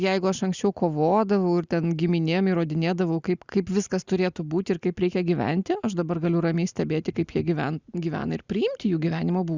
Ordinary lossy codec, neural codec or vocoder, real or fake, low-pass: Opus, 64 kbps; none; real; 7.2 kHz